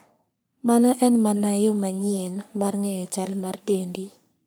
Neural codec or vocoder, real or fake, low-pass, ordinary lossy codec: codec, 44.1 kHz, 3.4 kbps, Pupu-Codec; fake; none; none